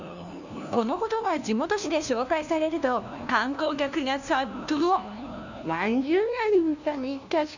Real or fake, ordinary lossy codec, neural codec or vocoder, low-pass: fake; none; codec, 16 kHz, 1 kbps, FunCodec, trained on LibriTTS, 50 frames a second; 7.2 kHz